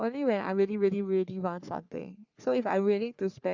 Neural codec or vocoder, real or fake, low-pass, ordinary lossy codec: codec, 44.1 kHz, 3.4 kbps, Pupu-Codec; fake; 7.2 kHz; Opus, 64 kbps